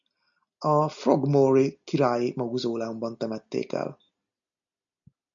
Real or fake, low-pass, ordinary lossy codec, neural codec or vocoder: real; 7.2 kHz; MP3, 64 kbps; none